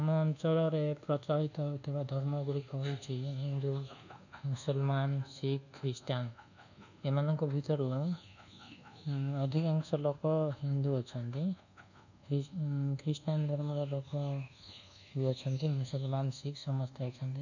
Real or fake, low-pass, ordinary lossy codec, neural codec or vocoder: fake; 7.2 kHz; none; codec, 24 kHz, 1.2 kbps, DualCodec